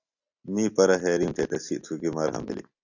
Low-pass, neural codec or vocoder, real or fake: 7.2 kHz; none; real